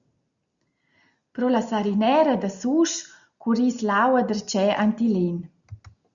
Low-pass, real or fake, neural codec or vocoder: 7.2 kHz; real; none